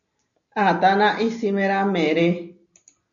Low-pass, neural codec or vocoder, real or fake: 7.2 kHz; none; real